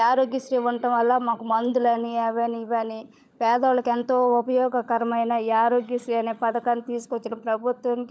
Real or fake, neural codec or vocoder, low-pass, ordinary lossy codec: fake; codec, 16 kHz, 16 kbps, FunCodec, trained on LibriTTS, 50 frames a second; none; none